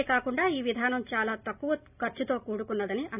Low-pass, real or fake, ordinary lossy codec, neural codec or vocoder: 3.6 kHz; real; none; none